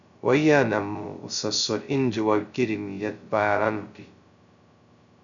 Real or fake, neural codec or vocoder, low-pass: fake; codec, 16 kHz, 0.2 kbps, FocalCodec; 7.2 kHz